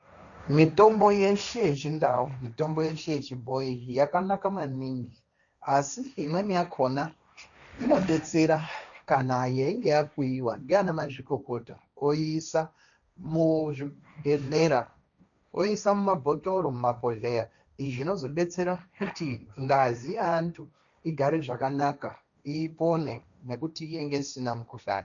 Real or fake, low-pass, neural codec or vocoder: fake; 7.2 kHz; codec, 16 kHz, 1.1 kbps, Voila-Tokenizer